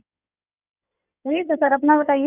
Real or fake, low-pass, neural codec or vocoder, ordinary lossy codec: fake; 3.6 kHz; codec, 16 kHz in and 24 kHz out, 2.2 kbps, FireRedTTS-2 codec; none